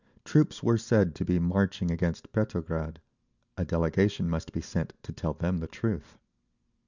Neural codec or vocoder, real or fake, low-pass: none; real; 7.2 kHz